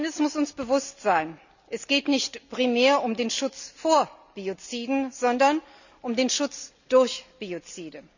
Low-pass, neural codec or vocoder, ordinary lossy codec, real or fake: 7.2 kHz; none; none; real